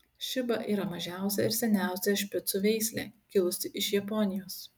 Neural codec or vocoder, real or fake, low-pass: none; real; 19.8 kHz